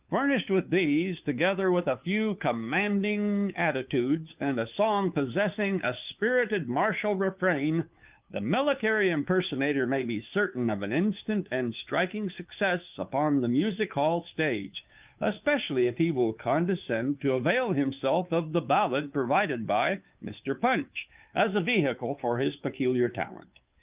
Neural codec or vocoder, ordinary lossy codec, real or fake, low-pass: codec, 16 kHz, 2 kbps, FunCodec, trained on Chinese and English, 25 frames a second; Opus, 24 kbps; fake; 3.6 kHz